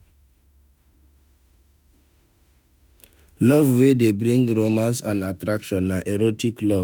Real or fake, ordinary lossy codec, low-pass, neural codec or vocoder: fake; none; none; autoencoder, 48 kHz, 32 numbers a frame, DAC-VAE, trained on Japanese speech